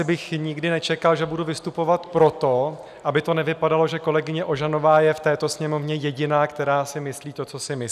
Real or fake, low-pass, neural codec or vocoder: real; 14.4 kHz; none